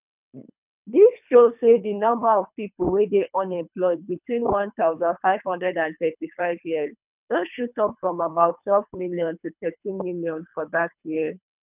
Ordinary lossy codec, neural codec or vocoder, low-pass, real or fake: none; codec, 24 kHz, 3 kbps, HILCodec; 3.6 kHz; fake